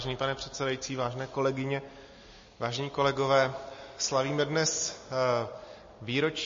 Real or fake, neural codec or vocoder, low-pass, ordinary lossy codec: real; none; 7.2 kHz; MP3, 32 kbps